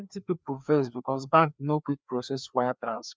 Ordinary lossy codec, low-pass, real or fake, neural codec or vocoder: none; none; fake; codec, 16 kHz, 2 kbps, FreqCodec, larger model